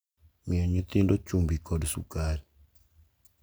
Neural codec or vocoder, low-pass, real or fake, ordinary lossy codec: none; none; real; none